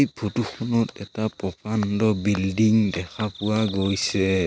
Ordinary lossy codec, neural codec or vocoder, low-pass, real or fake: none; none; none; real